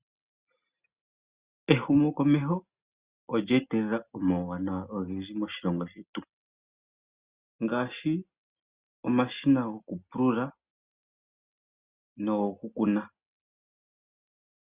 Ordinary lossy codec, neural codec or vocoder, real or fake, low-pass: Opus, 64 kbps; none; real; 3.6 kHz